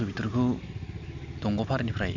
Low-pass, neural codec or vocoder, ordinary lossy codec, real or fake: 7.2 kHz; none; MP3, 64 kbps; real